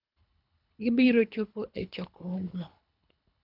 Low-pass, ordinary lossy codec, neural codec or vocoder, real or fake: 5.4 kHz; none; codec, 24 kHz, 3 kbps, HILCodec; fake